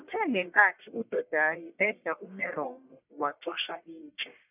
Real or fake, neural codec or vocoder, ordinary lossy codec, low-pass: fake; codec, 44.1 kHz, 1.7 kbps, Pupu-Codec; none; 3.6 kHz